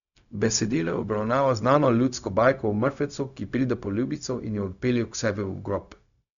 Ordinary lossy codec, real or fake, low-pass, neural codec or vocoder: none; fake; 7.2 kHz; codec, 16 kHz, 0.4 kbps, LongCat-Audio-Codec